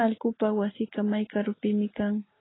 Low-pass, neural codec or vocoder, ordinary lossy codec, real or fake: 7.2 kHz; none; AAC, 16 kbps; real